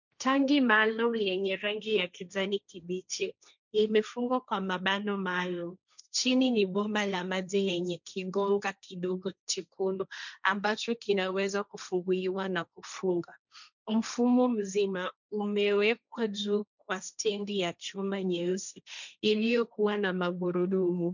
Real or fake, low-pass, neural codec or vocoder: fake; 7.2 kHz; codec, 16 kHz, 1.1 kbps, Voila-Tokenizer